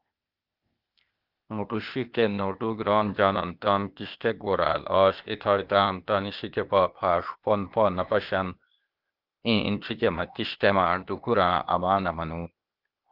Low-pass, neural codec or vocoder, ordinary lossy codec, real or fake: 5.4 kHz; codec, 16 kHz, 0.8 kbps, ZipCodec; Opus, 24 kbps; fake